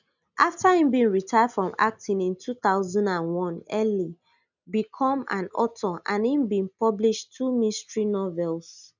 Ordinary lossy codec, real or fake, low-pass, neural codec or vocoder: none; real; 7.2 kHz; none